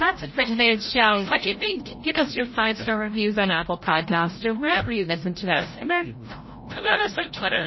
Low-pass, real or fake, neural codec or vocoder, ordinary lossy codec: 7.2 kHz; fake; codec, 16 kHz, 0.5 kbps, FreqCodec, larger model; MP3, 24 kbps